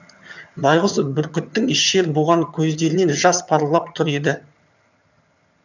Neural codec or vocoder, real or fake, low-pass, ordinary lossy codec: vocoder, 22.05 kHz, 80 mel bands, HiFi-GAN; fake; 7.2 kHz; none